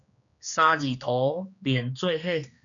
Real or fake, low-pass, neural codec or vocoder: fake; 7.2 kHz; codec, 16 kHz, 2 kbps, X-Codec, HuBERT features, trained on general audio